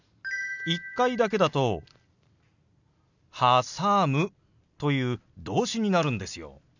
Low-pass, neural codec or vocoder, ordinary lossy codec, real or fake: 7.2 kHz; none; none; real